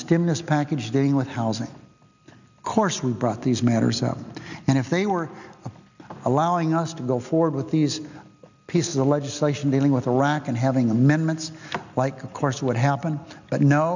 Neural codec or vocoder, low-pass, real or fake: none; 7.2 kHz; real